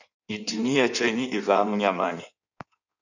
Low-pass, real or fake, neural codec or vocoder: 7.2 kHz; fake; codec, 16 kHz in and 24 kHz out, 1.1 kbps, FireRedTTS-2 codec